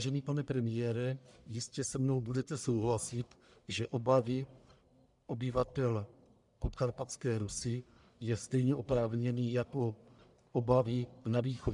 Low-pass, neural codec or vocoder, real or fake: 10.8 kHz; codec, 44.1 kHz, 1.7 kbps, Pupu-Codec; fake